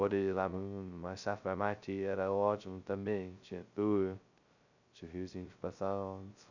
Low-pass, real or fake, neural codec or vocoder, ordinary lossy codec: 7.2 kHz; fake; codec, 16 kHz, 0.2 kbps, FocalCodec; none